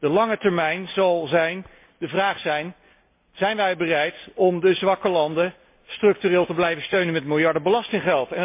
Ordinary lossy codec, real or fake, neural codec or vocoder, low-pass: MP3, 24 kbps; real; none; 3.6 kHz